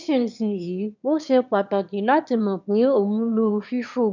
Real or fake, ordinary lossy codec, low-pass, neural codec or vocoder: fake; none; 7.2 kHz; autoencoder, 22.05 kHz, a latent of 192 numbers a frame, VITS, trained on one speaker